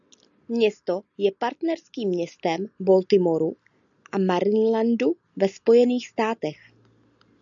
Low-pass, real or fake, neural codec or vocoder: 7.2 kHz; real; none